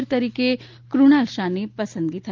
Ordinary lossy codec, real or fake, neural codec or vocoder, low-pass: Opus, 32 kbps; real; none; 7.2 kHz